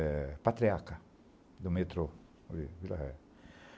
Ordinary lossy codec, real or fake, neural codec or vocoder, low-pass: none; real; none; none